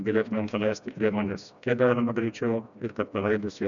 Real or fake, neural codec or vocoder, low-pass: fake; codec, 16 kHz, 1 kbps, FreqCodec, smaller model; 7.2 kHz